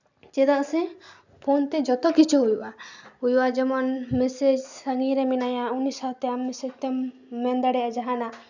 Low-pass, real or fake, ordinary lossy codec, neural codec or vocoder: 7.2 kHz; real; none; none